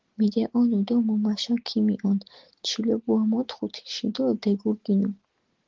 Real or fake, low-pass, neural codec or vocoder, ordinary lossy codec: real; 7.2 kHz; none; Opus, 16 kbps